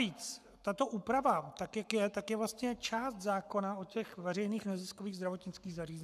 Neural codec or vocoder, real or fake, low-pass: codec, 44.1 kHz, 7.8 kbps, Pupu-Codec; fake; 14.4 kHz